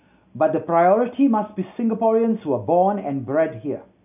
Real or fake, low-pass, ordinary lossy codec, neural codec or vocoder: real; 3.6 kHz; none; none